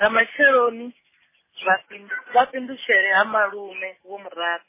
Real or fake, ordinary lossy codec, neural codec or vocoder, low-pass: real; MP3, 16 kbps; none; 3.6 kHz